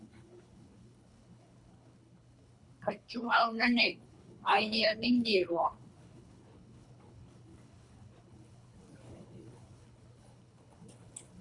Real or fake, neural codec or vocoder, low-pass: fake; codec, 24 kHz, 3 kbps, HILCodec; 10.8 kHz